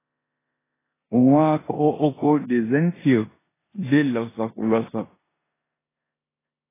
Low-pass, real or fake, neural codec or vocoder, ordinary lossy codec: 3.6 kHz; fake; codec, 16 kHz in and 24 kHz out, 0.9 kbps, LongCat-Audio-Codec, four codebook decoder; AAC, 16 kbps